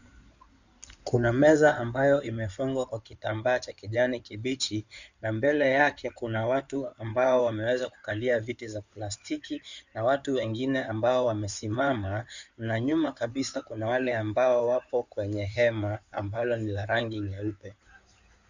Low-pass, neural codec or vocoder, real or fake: 7.2 kHz; codec, 16 kHz in and 24 kHz out, 2.2 kbps, FireRedTTS-2 codec; fake